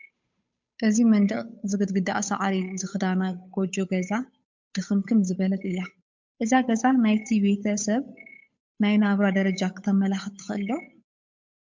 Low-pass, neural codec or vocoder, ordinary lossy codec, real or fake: 7.2 kHz; codec, 16 kHz, 8 kbps, FunCodec, trained on Chinese and English, 25 frames a second; MP3, 64 kbps; fake